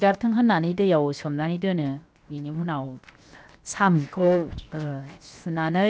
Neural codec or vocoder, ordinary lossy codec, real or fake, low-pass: codec, 16 kHz, 0.7 kbps, FocalCodec; none; fake; none